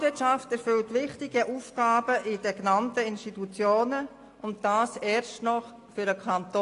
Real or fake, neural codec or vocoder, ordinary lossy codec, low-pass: real; none; AAC, 48 kbps; 10.8 kHz